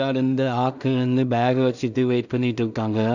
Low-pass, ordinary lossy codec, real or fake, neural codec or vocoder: 7.2 kHz; none; fake; codec, 16 kHz in and 24 kHz out, 0.4 kbps, LongCat-Audio-Codec, two codebook decoder